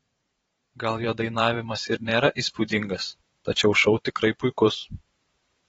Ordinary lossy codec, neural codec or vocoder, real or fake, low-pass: AAC, 24 kbps; vocoder, 44.1 kHz, 128 mel bands every 512 samples, BigVGAN v2; fake; 19.8 kHz